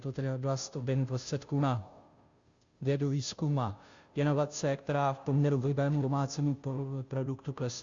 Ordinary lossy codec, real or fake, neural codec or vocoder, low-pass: Opus, 64 kbps; fake; codec, 16 kHz, 0.5 kbps, FunCodec, trained on Chinese and English, 25 frames a second; 7.2 kHz